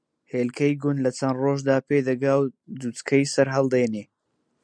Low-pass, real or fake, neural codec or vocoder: 9.9 kHz; real; none